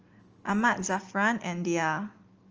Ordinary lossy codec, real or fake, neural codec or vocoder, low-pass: Opus, 24 kbps; real; none; 7.2 kHz